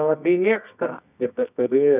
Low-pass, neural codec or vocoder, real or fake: 3.6 kHz; codec, 24 kHz, 0.9 kbps, WavTokenizer, medium music audio release; fake